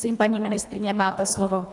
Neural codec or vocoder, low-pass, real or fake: codec, 24 kHz, 1.5 kbps, HILCodec; 10.8 kHz; fake